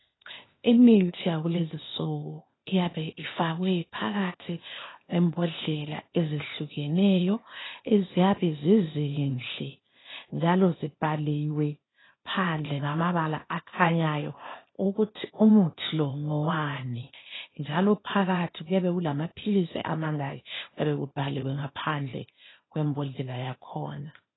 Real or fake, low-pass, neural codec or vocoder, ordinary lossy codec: fake; 7.2 kHz; codec, 16 kHz, 0.8 kbps, ZipCodec; AAC, 16 kbps